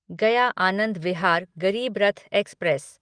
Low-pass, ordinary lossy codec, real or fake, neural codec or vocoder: 9.9 kHz; Opus, 24 kbps; real; none